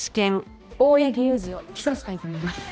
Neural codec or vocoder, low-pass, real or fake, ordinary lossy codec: codec, 16 kHz, 1 kbps, X-Codec, HuBERT features, trained on balanced general audio; none; fake; none